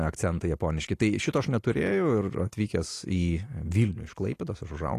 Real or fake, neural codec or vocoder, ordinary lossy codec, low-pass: real; none; AAC, 48 kbps; 14.4 kHz